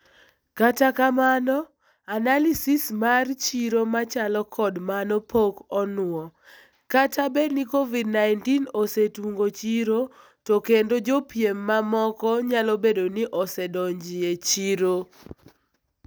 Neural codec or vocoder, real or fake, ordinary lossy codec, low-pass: none; real; none; none